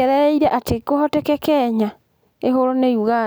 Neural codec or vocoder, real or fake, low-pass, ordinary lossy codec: none; real; none; none